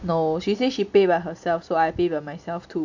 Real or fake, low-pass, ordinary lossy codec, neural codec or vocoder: real; 7.2 kHz; none; none